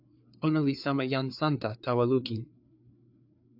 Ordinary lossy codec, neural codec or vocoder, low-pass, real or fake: AAC, 48 kbps; codec, 16 kHz, 4 kbps, FreqCodec, larger model; 5.4 kHz; fake